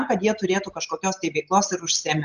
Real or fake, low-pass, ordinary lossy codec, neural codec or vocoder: real; 7.2 kHz; Opus, 24 kbps; none